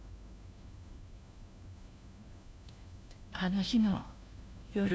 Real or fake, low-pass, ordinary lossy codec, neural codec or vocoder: fake; none; none; codec, 16 kHz, 1 kbps, FunCodec, trained on LibriTTS, 50 frames a second